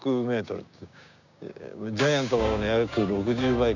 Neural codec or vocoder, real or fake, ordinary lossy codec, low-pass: codec, 16 kHz, 6 kbps, DAC; fake; none; 7.2 kHz